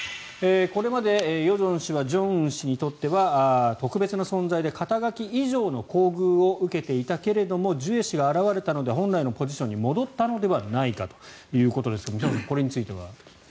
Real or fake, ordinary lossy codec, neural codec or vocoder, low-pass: real; none; none; none